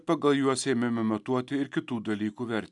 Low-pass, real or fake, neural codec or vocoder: 10.8 kHz; real; none